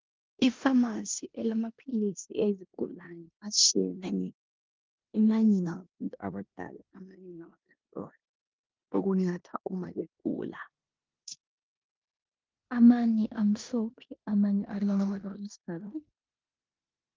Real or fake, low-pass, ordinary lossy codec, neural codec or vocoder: fake; 7.2 kHz; Opus, 32 kbps; codec, 16 kHz in and 24 kHz out, 0.9 kbps, LongCat-Audio-Codec, four codebook decoder